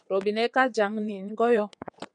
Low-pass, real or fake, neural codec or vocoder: 9.9 kHz; fake; vocoder, 22.05 kHz, 80 mel bands, WaveNeXt